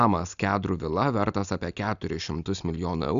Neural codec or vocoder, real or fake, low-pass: none; real; 7.2 kHz